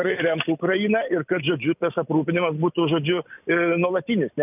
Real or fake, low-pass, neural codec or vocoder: real; 3.6 kHz; none